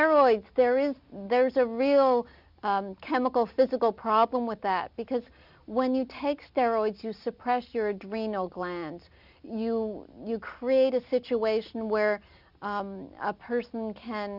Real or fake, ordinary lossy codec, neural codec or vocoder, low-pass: real; Opus, 64 kbps; none; 5.4 kHz